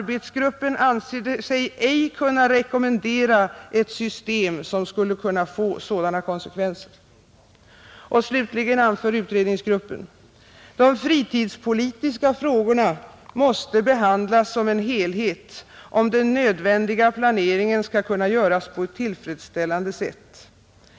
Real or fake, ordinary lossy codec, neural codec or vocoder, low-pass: real; none; none; none